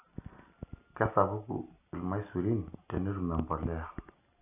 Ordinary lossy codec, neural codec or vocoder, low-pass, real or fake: none; none; 3.6 kHz; real